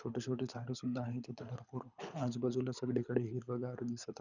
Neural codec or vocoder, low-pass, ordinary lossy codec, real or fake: codec, 24 kHz, 6 kbps, HILCodec; 7.2 kHz; none; fake